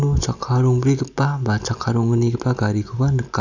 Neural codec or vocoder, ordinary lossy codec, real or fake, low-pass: none; none; real; 7.2 kHz